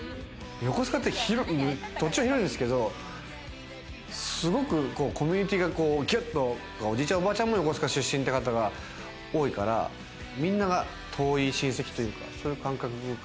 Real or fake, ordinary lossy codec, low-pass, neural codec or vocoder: real; none; none; none